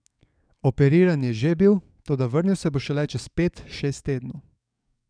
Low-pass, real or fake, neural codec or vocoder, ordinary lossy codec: 9.9 kHz; fake; codec, 44.1 kHz, 7.8 kbps, DAC; none